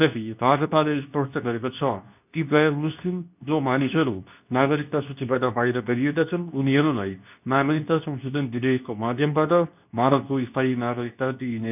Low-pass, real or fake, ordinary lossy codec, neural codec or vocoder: 3.6 kHz; fake; none; codec, 24 kHz, 0.9 kbps, WavTokenizer, medium speech release version 2